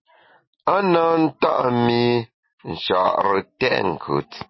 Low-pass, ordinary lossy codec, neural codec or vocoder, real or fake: 7.2 kHz; MP3, 24 kbps; none; real